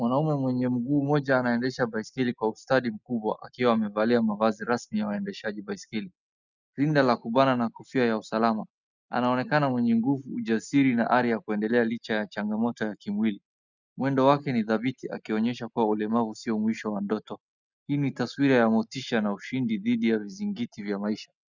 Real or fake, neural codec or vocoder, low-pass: real; none; 7.2 kHz